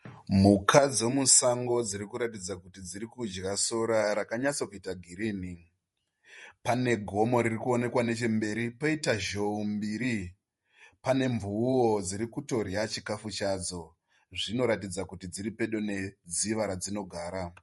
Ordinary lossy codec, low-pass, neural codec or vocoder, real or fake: MP3, 48 kbps; 19.8 kHz; vocoder, 48 kHz, 128 mel bands, Vocos; fake